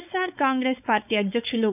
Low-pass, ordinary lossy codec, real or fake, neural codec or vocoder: 3.6 kHz; none; fake; codec, 16 kHz, 4.8 kbps, FACodec